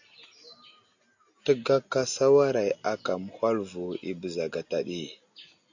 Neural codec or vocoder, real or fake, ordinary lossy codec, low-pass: none; real; AAC, 48 kbps; 7.2 kHz